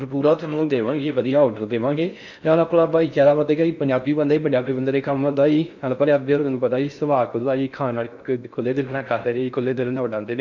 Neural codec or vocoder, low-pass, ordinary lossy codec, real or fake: codec, 16 kHz in and 24 kHz out, 0.6 kbps, FocalCodec, streaming, 4096 codes; 7.2 kHz; none; fake